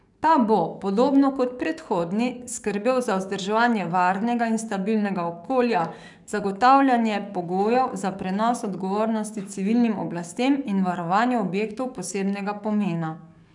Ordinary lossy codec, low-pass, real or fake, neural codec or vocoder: none; 10.8 kHz; fake; codec, 44.1 kHz, 7.8 kbps, DAC